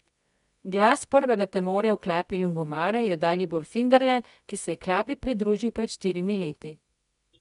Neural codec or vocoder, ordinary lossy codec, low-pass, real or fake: codec, 24 kHz, 0.9 kbps, WavTokenizer, medium music audio release; none; 10.8 kHz; fake